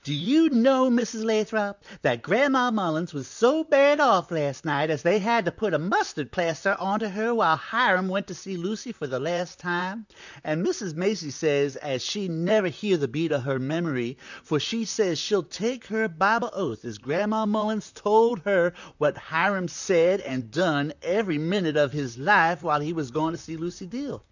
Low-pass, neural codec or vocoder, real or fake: 7.2 kHz; vocoder, 44.1 kHz, 128 mel bands, Pupu-Vocoder; fake